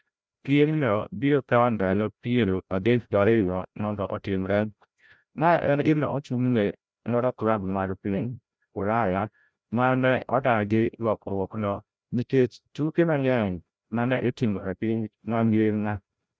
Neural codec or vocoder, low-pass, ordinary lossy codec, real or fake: codec, 16 kHz, 0.5 kbps, FreqCodec, larger model; none; none; fake